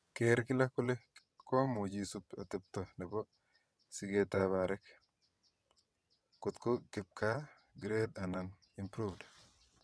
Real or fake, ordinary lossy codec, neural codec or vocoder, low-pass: fake; none; vocoder, 22.05 kHz, 80 mel bands, WaveNeXt; none